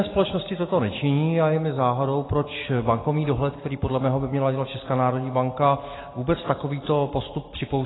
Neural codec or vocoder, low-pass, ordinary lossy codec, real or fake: none; 7.2 kHz; AAC, 16 kbps; real